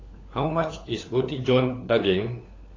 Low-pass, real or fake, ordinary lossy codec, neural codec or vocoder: 7.2 kHz; fake; AAC, 32 kbps; codec, 16 kHz, 4 kbps, FunCodec, trained on LibriTTS, 50 frames a second